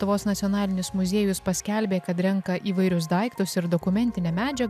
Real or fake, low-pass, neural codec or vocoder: real; 14.4 kHz; none